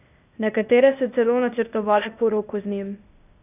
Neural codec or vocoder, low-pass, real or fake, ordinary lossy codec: codec, 16 kHz, 0.8 kbps, ZipCodec; 3.6 kHz; fake; none